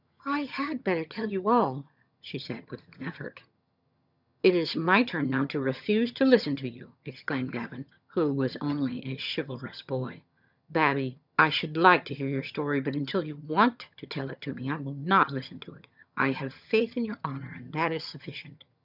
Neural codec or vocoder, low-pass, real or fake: vocoder, 22.05 kHz, 80 mel bands, HiFi-GAN; 5.4 kHz; fake